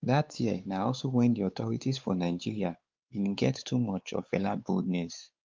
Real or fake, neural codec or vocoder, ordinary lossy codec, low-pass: fake; codec, 16 kHz, 4 kbps, X-Codec, WavLM features, trained on Multilingual LibriSpeech; Opus, 32 kbps; 7.2 kHz